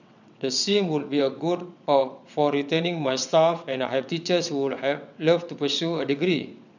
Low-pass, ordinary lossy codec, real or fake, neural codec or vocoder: 7.2 kHz; none; fake; vocoder, 22.05 kHz, 80 mel bands, WaveNeXt